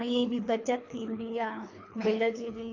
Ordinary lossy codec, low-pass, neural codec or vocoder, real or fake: AAC, 48 kbps; 7.2 kHz; codec, 24 kHz, 3 kbps, HILCodec; fake